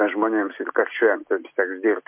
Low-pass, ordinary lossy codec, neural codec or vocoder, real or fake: 3.6 kHz; MP3, 32 kbps; none; real